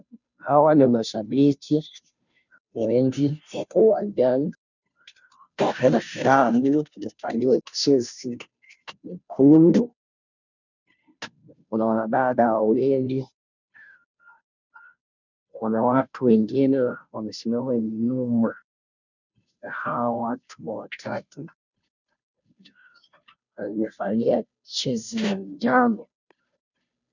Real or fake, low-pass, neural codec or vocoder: fake; 7.2 kHz; codec, 16 kHz, 0.5 kbps, FunCodec, trained on Chinese and English, 25 frames a second